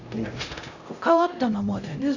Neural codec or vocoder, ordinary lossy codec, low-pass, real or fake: codec, 16 kHz, 0.5 kbps, X-Codec, HuBERT features, trained on LibriSpeech; none; 7.2 kHz; fake